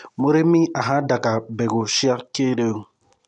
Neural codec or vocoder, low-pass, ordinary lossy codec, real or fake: none; 10.8 kHz; none; real